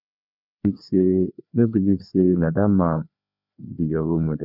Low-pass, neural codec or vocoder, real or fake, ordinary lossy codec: 5.4 kHz; codec, 16 kHz, 2 kbps, FreqCodec, larger model; fake; none